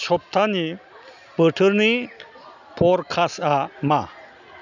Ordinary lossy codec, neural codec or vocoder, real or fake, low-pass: none; none; real; 7.2 kHz